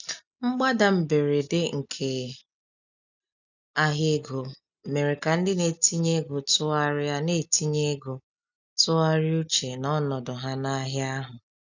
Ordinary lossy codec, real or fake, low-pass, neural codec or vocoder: none; real; 7.2 kHz; none